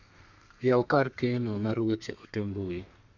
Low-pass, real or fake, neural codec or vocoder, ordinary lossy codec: 7.2 kHz; fake; codec, 32 kHz, 1.9 kbps, SNAC; none